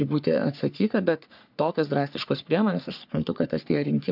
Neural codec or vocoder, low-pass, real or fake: codec, 44.1 kHz, 3.4 kbps, Pupu-Codec; 5.4 kHz; fake